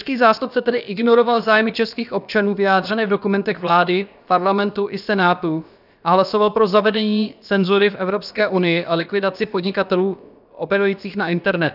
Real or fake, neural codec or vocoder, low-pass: fake; codec, 16 kHz, about 1 kbps, DyCAST, with the encoder's durations; 5.4 kHz